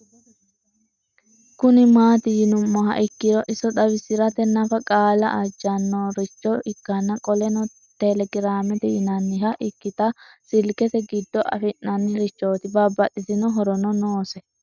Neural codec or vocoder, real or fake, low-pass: none; real; 7.2 kHz